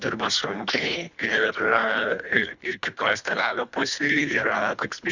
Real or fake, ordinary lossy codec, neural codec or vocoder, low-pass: fake; Opus, 64 kbps; codec, 24 kHz, 1.5 kbps, HILCodec; 7.2 kHz